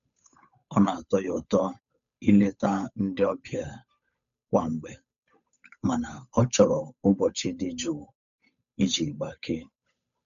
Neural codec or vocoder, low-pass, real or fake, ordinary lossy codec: codec, 16 kHz, 8 kbps, FunCodec, trained on Chinese and English, 25 frames a second; 7.2 kHz; fake; none